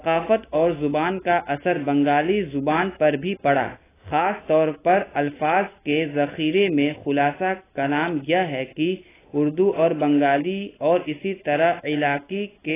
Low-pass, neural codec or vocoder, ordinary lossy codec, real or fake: 3.6 kHz; none; AAC, 16 kbps; real